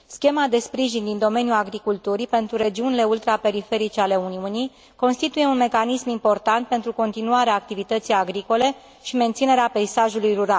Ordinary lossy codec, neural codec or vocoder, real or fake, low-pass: none; none; real; none